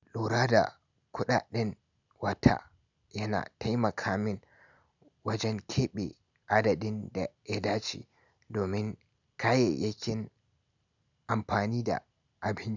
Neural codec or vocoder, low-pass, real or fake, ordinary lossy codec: none; 7.2 kHz; real; none